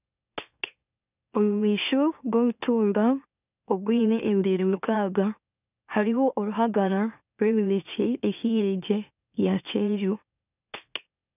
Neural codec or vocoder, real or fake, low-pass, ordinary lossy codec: autoencoder, 44.1 kHz, a latent of 192 numbers a frame, MeloTTS; fake; 3.6 kHz; none